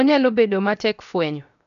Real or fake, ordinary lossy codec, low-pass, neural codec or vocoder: fake; none; 7.2 kHz; codec, 16 kHz, 0.7 kbps, FocalCodec